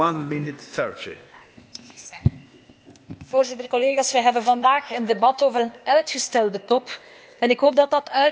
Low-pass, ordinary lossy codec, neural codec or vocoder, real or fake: none; none; codec, 16 kHz, 0.8 kbps, ZipCodec; fake